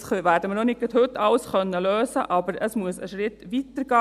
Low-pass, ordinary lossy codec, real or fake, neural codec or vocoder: 14.4 kHz; MP3, 96 kbps; real; none